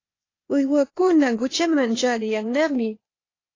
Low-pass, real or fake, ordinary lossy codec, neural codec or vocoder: 7.2 kHz; fake; AAC, 32 kbps; codec, 16 kHz, 0.8 kbps, ZipCodec